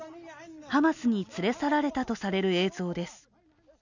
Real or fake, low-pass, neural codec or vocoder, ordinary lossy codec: real; 7.2 kHz; none; none